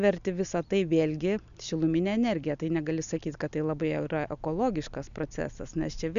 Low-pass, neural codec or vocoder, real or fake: 7.2 kHz; none; real